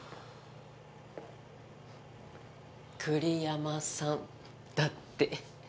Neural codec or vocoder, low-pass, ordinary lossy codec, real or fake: none; none; none; real